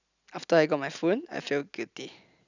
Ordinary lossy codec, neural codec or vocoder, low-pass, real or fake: none; none; 7.2 kHz; real